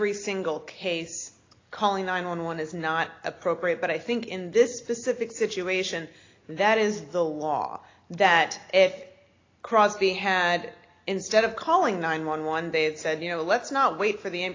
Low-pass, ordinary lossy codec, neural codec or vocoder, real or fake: 7.2 kHz; AAC, 32 kbps; none; real